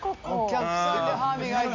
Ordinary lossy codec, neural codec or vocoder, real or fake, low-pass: MP3, 64 kbps; none; real; 7.2 kHz